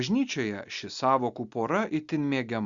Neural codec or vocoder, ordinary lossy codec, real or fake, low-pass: none; Opus, 64 kbps; real; 7.2 kHz